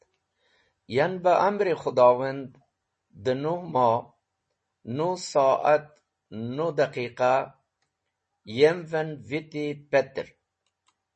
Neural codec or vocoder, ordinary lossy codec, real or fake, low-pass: none; MP3, 32 kbps; real; 10.8 kHz